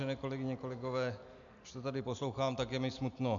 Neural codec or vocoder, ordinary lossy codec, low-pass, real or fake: none; MP3, 96 kbps; 7.2 kHz; real